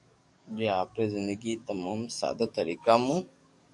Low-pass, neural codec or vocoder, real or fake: 10.8 kHz; codec, 44.1 kHz, 7.8 kbps, DAC; fake